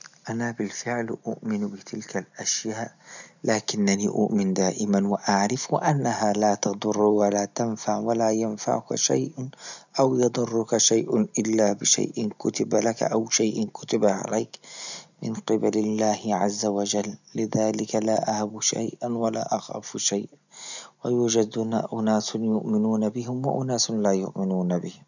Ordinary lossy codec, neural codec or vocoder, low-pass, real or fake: none; none; 7.2 kHz; real